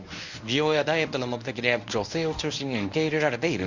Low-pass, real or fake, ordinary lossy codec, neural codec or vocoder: 7.2 kHz; fake; none; codec, 24 kHz, 0.9 kbps, WavTokenizer, medium speech release version 1